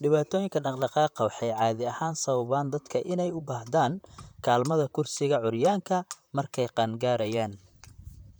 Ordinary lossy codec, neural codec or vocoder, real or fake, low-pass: none; vocoder, 44.1 kHz, 128 mel bands, Pupu-Vocoder; fake; none